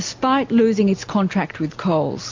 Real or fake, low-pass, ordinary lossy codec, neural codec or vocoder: real; 7.2 kHz; MP3, 48 kbps; none